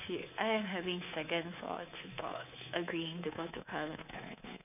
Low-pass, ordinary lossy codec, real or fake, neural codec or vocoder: 3.6 kHz; none; fake; codec, 24 kHz, 3.1 kbps, DualCodec